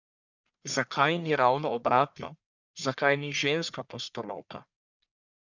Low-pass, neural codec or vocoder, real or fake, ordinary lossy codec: 7.2 kHz; codec, 44.1 kHz, 1.7 kbps, Pupu-Codec; fake; none